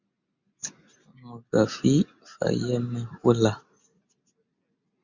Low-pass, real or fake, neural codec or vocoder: 7.2 kHz; real; none